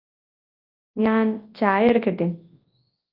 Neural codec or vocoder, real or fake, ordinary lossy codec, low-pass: codec, 24 kHz, 0.9 kbps, WavTokenizer, large speech release; fake; Opus, 24 kbps; 5.4 kHz